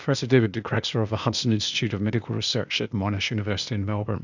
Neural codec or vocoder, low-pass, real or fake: codec, 16 kHz, 0.8 kbps, ZipCodec; 7.2 kHz; fake